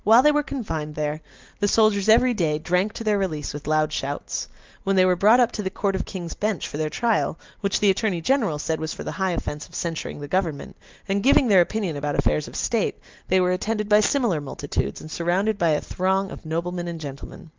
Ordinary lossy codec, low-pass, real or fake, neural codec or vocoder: Opus, 32 kbps; 7.2 kHz; real; none